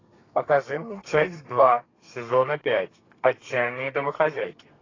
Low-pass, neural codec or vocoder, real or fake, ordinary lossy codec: 7.2 kHz; codec, 32 kHz, 1.9 kbps, SNAC; fake; AAC, 32 kbps